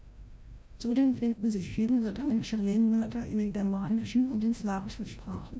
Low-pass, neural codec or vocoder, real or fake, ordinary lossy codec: none; codec, 16 kHz, 0.5 kbps, FreqCodec, larger model; fake; none